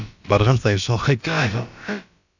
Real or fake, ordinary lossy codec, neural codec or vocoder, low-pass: fake; none; codec, 16 kHz, about 1 kbps, DyCAST, with the encoder's durations; 7.2 kHz